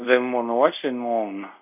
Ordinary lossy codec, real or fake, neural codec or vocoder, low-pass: none; fake; codec, 24 kHz, 0.5 kbps, DualCodec; 3.6 kHz